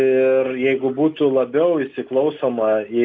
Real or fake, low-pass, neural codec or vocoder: real; 7.2 kHz; none